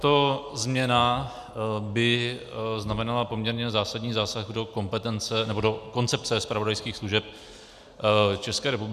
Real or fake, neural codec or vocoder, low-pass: real; none; 14.4 kHz